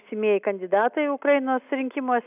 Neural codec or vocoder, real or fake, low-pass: none; real; 3.6 kHz